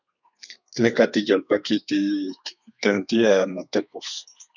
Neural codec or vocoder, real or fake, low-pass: codec, 32 kHz, 1.9 kbps, SNAC; fake; 7.2 kHz